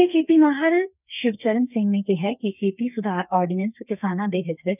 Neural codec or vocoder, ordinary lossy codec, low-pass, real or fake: codec, 32 kHz, 1.9 kbps, SNAC; none; 3.6 kHz; fake